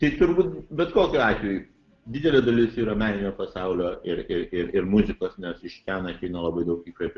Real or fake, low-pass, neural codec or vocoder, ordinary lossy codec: real; 7.2 kHz; none; Opus, 32 kbps